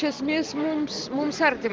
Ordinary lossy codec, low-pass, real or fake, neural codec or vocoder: Opus, 16 kbps; 7.2 kHz; real; none